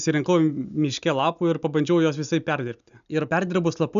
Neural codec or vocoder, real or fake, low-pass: none; real; 7.2 kHz